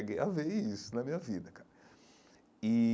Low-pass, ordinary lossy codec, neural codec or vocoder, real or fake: none; none; none; real